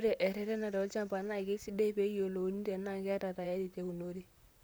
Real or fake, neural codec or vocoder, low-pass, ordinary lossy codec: fake; vocoder, 44.1 kHz, 128 mel bands, Pupu-Vocoder; none; none